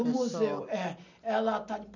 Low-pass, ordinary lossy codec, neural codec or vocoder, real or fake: 7.2 kHz; none; none; real